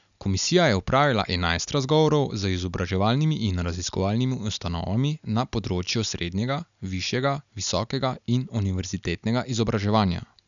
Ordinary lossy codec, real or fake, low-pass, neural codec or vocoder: none; real; 7.2 kHz; none